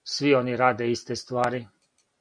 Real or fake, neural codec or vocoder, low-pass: real; none; 9.9 kHz